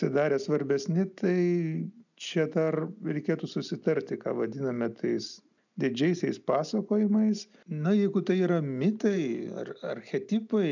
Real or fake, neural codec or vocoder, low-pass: real; none; 7.2 kHz